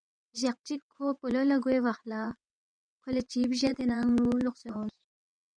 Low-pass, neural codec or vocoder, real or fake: 9.9 kHz; vocoder, 22.05 kHz, 80 mel bands, WaveNeXt; fake